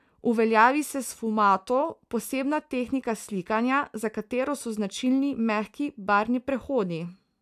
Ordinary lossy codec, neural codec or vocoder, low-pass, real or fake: none; none; 14.4 kHz; real